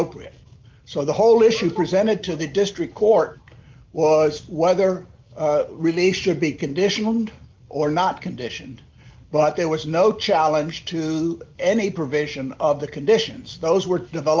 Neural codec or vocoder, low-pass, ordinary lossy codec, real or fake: none; 7.2 kHz; Opus, 24 kbps; real